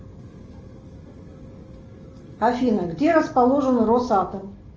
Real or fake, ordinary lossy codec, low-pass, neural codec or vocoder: real; Opus, 24 kbps; 7.2 kHz; none